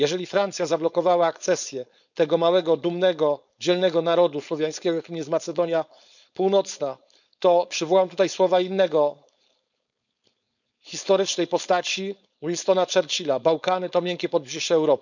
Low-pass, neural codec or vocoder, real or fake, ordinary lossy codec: 7.2 kHz; codec, 16 kHz, 4.8 kbps, FACodec; fake; none